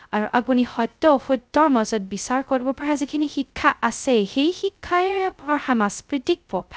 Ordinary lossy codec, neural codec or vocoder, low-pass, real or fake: none; codec, 16 kHz, 0.2 kbps, FocalCodec; none; fake